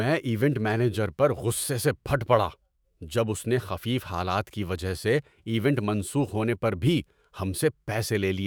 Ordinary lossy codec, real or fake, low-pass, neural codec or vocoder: none; fake; none; autoencoder, 48 kHz, 128 numbers a frame, DAC-VAE, trained on Japanese speech